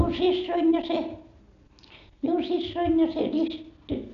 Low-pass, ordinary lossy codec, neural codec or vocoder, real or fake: 7.2 kHz; none; none; real